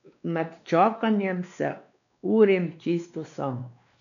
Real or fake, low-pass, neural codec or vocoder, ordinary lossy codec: fake; 7.2 kHz; codec, 16 kHz, 2 kbps, X-Codec, WavLM features, trained on Multilingual LibriSpeech; none